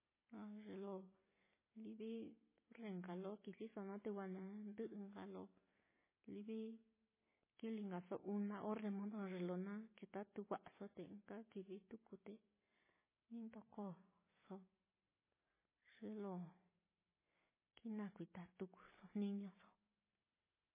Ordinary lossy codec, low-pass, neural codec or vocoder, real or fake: MP3, 16 kbps; 3.6 kHz; none; real